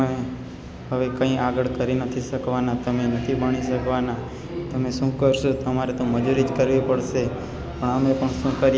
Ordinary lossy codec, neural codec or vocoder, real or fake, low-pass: none; none; real; none